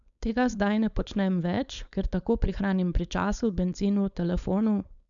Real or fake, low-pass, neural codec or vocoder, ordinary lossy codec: fake; 7.2 kHz; codec, 16 kHz, 4.8 kbps, FACodec; none